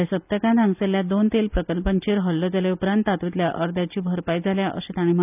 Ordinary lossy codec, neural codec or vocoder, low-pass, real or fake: none; none; 3.6 kHz; real